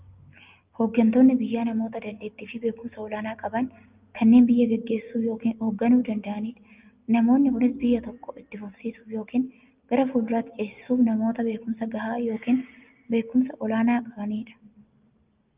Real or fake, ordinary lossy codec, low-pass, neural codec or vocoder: real; Opus, 24 kbps; 3.6 kHz; none